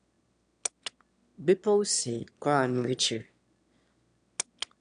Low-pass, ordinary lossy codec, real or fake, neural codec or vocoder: 9.9 kHz; none; fake; autoencoder, 22.05 kHz, a latent of 192 numbers a frame, VITS, trained on one speaker